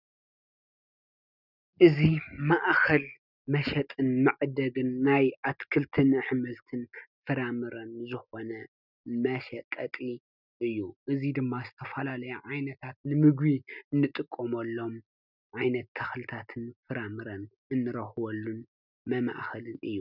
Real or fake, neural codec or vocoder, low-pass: real; none; 5.4 kHz